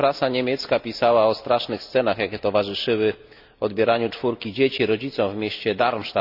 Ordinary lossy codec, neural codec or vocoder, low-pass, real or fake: none; none; 5.4 kHz; real